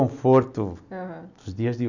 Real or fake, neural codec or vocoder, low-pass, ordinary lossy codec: real; none; 7.2 kHz; none